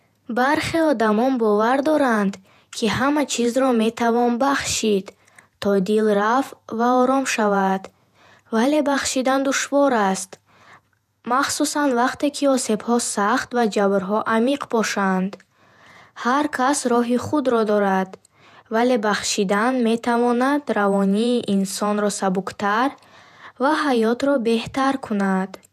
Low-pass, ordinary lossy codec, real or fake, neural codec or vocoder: 14.4 kHz; none; fake; vocoder, 48 kHz, 128 mel bands, Vocos